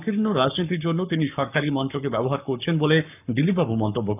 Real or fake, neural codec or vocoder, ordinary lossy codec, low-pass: fake; codec, 44.1 kHz, 7.8 kbps, Pupu-Codec; none; 3.6 kHz